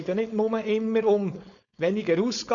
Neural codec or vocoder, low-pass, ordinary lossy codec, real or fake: codec, 16 kHz, 4.8 kbps, FACodec; 7.2 kHz; none; fake